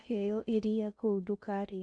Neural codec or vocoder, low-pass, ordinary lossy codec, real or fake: codec, 16 kHz in and 24 kHz out, 0.6 kbps, FocalCodec, streaming, 2048 codes; 9.9 kHz; MP3, 96 kbps; fake